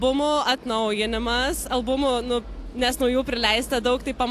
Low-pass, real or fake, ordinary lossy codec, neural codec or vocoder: 14.4 kHz; real; AAC, 64 kbps; none